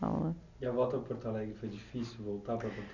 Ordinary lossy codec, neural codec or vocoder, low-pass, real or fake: none; none; 7.2 kHz; real